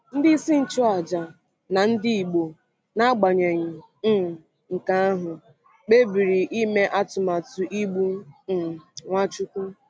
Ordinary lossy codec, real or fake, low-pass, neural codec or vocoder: none; real; none; none